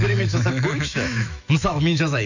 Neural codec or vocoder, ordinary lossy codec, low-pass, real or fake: autoencoder, 48 kHz, 128 numbers a frame, DAC-VAE, trained on Japanese speech; none; 7.2 kHz; fake